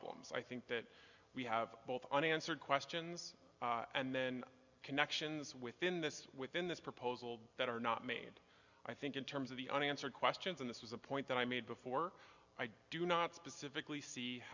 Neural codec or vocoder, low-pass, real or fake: none; 7.2 kHz; real